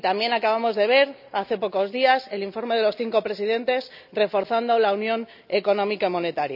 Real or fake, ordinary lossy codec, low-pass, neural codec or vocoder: real; none; 5.4 kHz; none